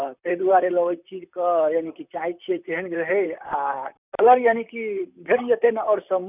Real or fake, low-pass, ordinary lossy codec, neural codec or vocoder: fake; 3.6 kHz; none; vocoder, 44.1 kHz, 128 mel bands, Pupu-Vocoder